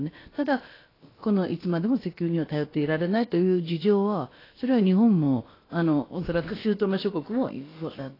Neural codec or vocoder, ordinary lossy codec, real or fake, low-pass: codec, 16 kHz, about 1 kbps, DyCAST, with the encoder's durations; AAC, 24 kbps; fake; 5.4 kHz